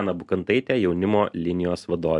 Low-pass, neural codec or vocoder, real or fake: 10.8 kHz; none; real